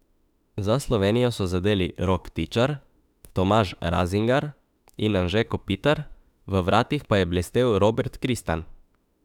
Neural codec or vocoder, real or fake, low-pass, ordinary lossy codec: autoencoder, 48 kHz, 32 numbers a frame, DAC-VAE, trained on Japanese speech; fake; 19.8 kHz; none